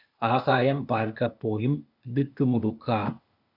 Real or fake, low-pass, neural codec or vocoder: fake; 5.4 kHz; codec, 16 kHz, 0.8 kbps, ZipCodec